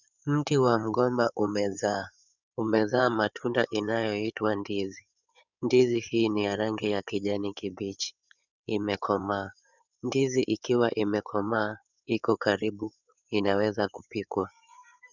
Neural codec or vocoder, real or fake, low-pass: codec, 16 kHz in and 24 kHz out, 2.2 kbps, FireRedTTS-2 codec; fake; 7.2 kHz